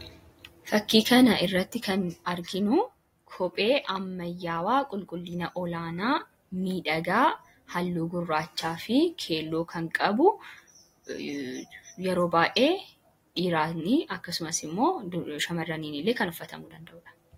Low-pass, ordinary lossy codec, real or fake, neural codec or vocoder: 19.8 kHz; AAC, 48 kbps; real; none